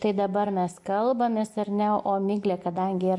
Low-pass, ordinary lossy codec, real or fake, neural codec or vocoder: 10.8 kHz; MP3, 64 kbps; real; none